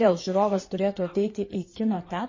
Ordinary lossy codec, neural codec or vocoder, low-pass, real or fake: MP3, 32 kbps; codec, 16 kHz in and 24 kHz out, 2.2 kbps, FireRedTTS-2 codec; 7.2 kHz; fake